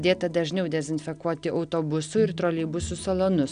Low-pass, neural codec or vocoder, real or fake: 9.9 kHz; none; real